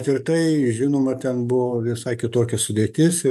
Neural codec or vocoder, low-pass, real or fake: codec, 44.1 kHz, 7.8 kbps, DAC; 14.4 kHz; fake